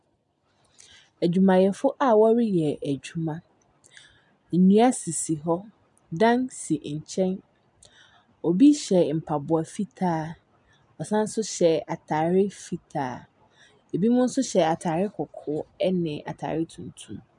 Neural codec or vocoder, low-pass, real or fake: none; 10.8 kHz; real